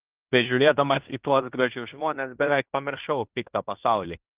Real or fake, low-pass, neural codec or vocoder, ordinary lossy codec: fake; 3.6 kHz; codec, 16 kHz in and 24 kHz out, 0.9 kbps, LongCat-Audio-Codec, fine tuned four codebook decoder; Opus, 32 kbps